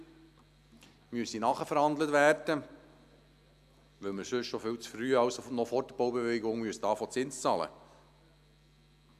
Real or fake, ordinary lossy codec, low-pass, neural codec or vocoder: real; none; 14.4 kHz; none